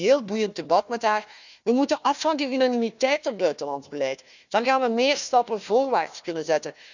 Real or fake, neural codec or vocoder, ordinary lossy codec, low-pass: fake; codec, 16 kHz, 1 kbps, FunCodec, trained on Chinese and English, 50 frames a second; none; 7.2 kHz